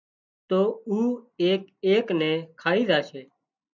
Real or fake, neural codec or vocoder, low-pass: real; none; 7.2 kHz